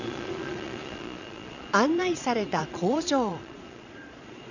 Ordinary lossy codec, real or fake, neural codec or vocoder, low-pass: none; fake; vocoder, 22.05 kHz, 80 mel bands, Vocos; 7.2 kHz